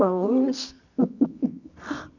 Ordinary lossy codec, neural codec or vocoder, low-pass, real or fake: none; codec, 24 kHz, 0.9 kbps, WavTokenizer, medium music audio release; 7.2 kHz; fake